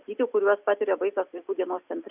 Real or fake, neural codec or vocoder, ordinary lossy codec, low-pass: real; none; Opus, 64 kbps; 3.6 kHz